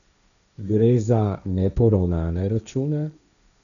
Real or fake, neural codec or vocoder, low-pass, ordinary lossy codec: fake; codec, 16 kHz, 1.1 kbps, Voila-Tokenizer; 7.2 kHz; none